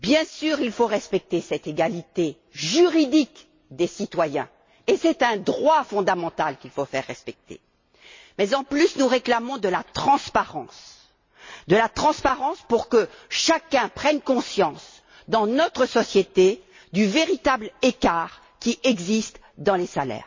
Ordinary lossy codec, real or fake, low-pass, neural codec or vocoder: MP3, 32 kbps; real; 7.2 kHz; none